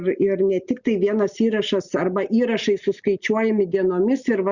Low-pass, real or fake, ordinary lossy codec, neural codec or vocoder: 7.2 kHz; real; Opus, 64 kbps; none